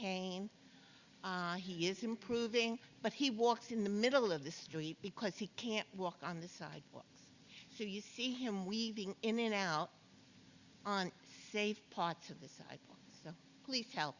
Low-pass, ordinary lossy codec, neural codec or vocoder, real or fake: 7.2 kHz; Opus, 64 kbps; none; real